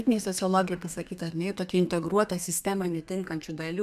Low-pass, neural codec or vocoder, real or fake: 14.4 kHz; codec, 32 kHz, 1.9 kbps, SNAC; fake